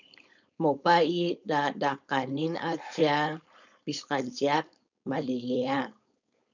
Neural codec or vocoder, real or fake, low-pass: codec, 16 kHz, 4.8 kbps, FACodec; fake; 7.2 kHz